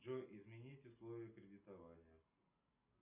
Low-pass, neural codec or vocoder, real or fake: 3.6 kHz; none; real